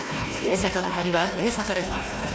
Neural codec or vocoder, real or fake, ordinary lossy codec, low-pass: codec, 16 kHz, 1 kbps, FunCodec, trained on Chinese and English, 50 frames a second; fake; none; none